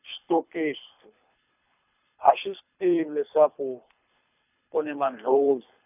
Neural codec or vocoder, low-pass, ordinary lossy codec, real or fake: codec, 24 kHz, 3 kbps, HILCodec; 3.6 kHz; none; fake